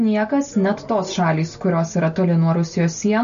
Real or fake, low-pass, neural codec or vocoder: real; 7.2 kHz; none